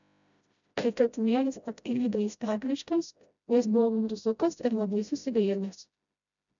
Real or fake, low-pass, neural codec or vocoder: fake; 7.2 kHz; codec, 16 kHz, 0.5 kbps, FreqCodec, smaller model